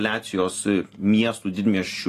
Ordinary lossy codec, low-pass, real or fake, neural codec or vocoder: AAC, 48 kbps; 14.4 kHz; real; none